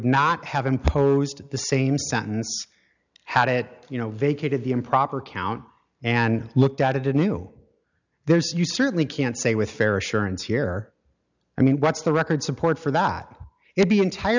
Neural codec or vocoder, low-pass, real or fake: none; 7.2 kHz; real